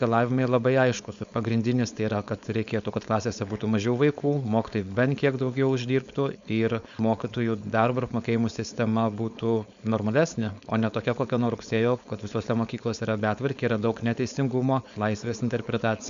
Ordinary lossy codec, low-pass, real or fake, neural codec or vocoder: MP3, 64 kbps; 7.2 kHz; fake; codec, 16 kHz, 4.8 kbps, FACodec